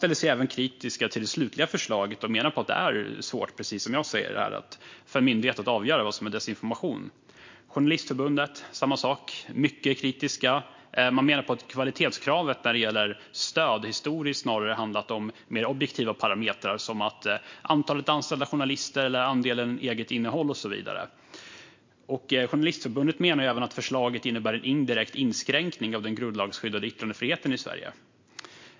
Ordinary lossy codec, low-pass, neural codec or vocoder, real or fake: MP3, 48 kbps; 7.2 kHz; none; real